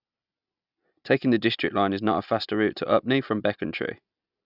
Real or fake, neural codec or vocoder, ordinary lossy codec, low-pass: real; none; none; 5.4 kHz